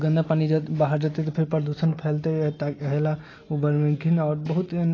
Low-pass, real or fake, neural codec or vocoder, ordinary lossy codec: 7.2 kHz; real; none; AAC, 32 kbps